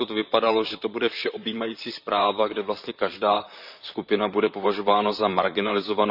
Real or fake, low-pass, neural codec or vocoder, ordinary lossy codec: fake; 5.4 kHz; vocoder, 44.1 kHz, 128 mel bands, Pupu-Vocoder; none